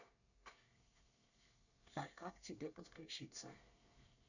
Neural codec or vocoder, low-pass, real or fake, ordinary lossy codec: codec, 24 kHz, 1 kbps, SNAC; 7.2 kHz; fake; none